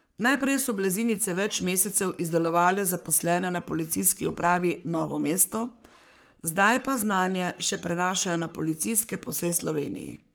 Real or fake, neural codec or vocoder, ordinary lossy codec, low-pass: fake; codec, 44.1 kHz, 3.4 kbps, Pupu-Codec; none; none